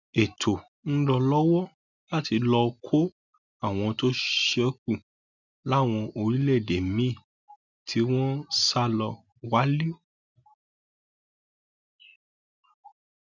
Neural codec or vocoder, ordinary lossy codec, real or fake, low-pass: none; none; real; 7.2 kHz